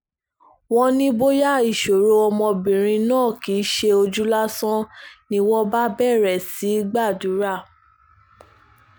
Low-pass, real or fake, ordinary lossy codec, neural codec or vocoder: none; real; none; none